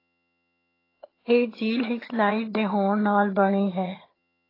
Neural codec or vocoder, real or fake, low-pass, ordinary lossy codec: vocoder, 22.05 kHz, 80 mel bands, HiFi-GAN; fake; 5.4 kHz; AAC, 24 kbps